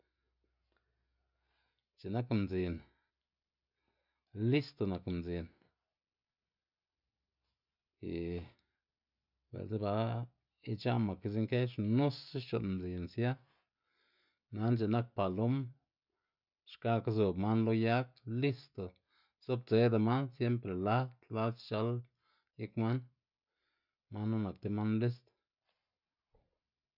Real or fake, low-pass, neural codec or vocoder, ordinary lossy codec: real; 5.4 kHz; none; none